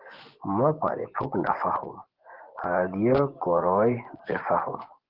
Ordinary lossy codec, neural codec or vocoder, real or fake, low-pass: Opus, 16 kbps; vocoder, 24 kHz, 100 mel bands, Vocos; fake; 5.4 kHz